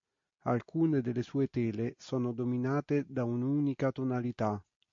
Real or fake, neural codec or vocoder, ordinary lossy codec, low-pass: real; none; MP3, 48 kbps; 7.2 kHz